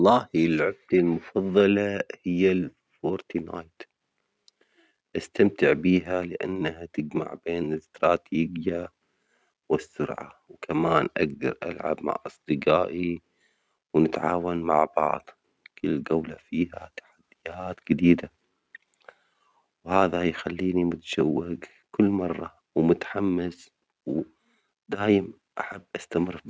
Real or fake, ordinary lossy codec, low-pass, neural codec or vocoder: real; none; none; none